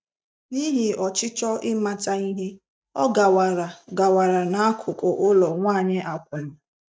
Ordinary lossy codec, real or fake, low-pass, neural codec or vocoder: none; real; none; none